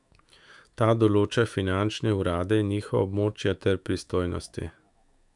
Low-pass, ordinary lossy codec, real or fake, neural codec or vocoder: 10.8 kHz; none; fake; autoencoder, 48 kHz, 128 numbers a frame, DAC-VAE, trained on Japanese speech